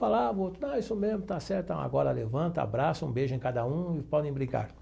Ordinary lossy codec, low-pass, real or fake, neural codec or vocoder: none; none; real; none